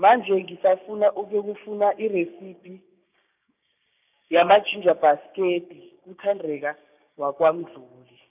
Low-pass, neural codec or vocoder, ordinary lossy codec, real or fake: 3.6 kHz; codec, 16 kHz, 6 kbps, DAC; none; fake